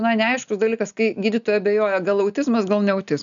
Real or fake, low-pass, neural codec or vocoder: real; 7.2 kHz; none